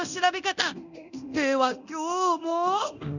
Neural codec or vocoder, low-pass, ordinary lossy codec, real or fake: codec, 24 kHz, 0.9 kbps, DualCodec; 7.2 kHz; none; fake